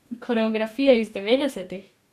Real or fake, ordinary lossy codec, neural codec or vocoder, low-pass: fake; none; codec, 44.1 kHz, 2.6 kbps, DAC; 14.4 kHz